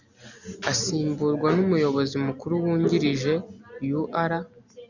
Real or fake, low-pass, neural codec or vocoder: real; 7.2 kHz; none